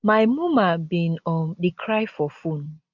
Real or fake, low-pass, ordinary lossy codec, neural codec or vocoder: real; 7.2 kHz; none; none